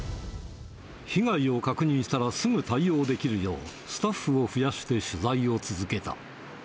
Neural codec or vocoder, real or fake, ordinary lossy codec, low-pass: none; real; none; none